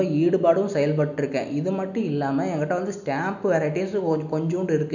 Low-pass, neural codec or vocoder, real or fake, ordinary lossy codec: 7.2 kHz; none; real; none